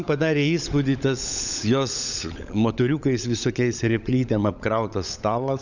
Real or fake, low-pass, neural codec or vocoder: fake; 7.2 kHz; codec, 16 kHz, 16 kbps, FunCodec, trained on LibriTTS, 50 frames a second